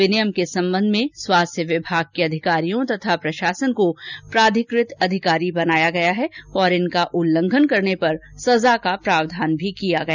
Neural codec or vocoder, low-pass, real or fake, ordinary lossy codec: none; 7.2 kHz; real; none